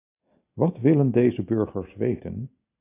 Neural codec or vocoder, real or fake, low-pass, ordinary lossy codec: none; real; 3.6 kHz; AAC, 24 kbps